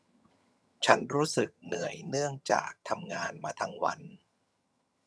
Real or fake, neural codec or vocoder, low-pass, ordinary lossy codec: fake; vocoder, 22.05 kHz, 80 mel bands, HiFi-GAN; none; none